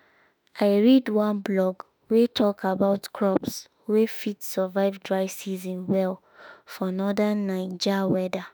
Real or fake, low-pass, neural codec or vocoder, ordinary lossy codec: fake; none; autoencoder, 48 kHz, 32 numbers a frame, DAC-VAE, trained on Japanese speech; none